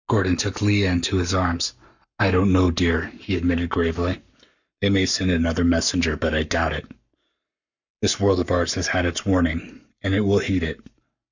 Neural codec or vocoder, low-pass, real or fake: codec, 44.1 kHz, 7.8 kbps, Pupu-Codec; 7.2 kHz; fake